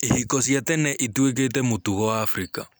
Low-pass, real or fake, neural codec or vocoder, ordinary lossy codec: none; real; none; none